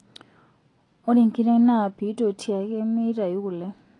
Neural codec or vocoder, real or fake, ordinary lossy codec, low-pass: none; real; AAC, 32 kbps; 10.8 kHz